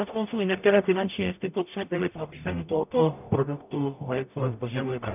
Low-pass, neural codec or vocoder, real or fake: 3.6 kHz; codec, 44.1 kHz, 0.9 kbps, DAC; fake